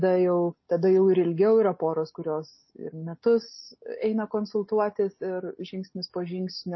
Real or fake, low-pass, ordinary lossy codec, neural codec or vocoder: real; 7.2 kHz; MP3, 24 kbps; none